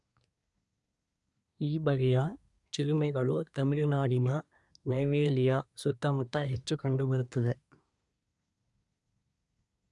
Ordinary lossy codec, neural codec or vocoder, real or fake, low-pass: none; codec, 24 kHz, 1 kbps, SNAC; fake; 10.8 kHz